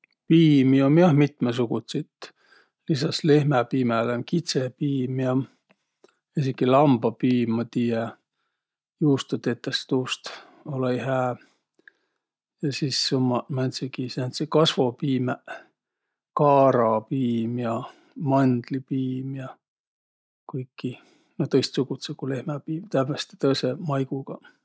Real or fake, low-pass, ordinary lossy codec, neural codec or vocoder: real; none; none; none